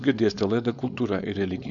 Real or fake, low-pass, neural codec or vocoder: fake; 7.2 kHz; codec, 16 kHz, 4.8 kbps, FACodec